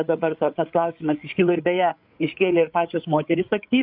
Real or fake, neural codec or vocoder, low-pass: fake; codec, 16 kHz, 8 kbps, FreqCodec, larger model; 5.4 kHz